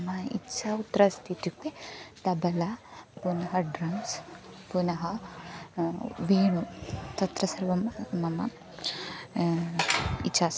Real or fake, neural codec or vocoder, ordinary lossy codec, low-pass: real; none; none; none